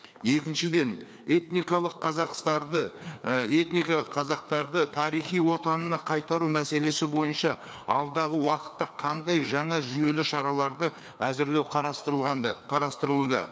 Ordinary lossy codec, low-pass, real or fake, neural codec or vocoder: none; none; fake; codec, 16 kHz, 2 kbps, FreqCodec, larger model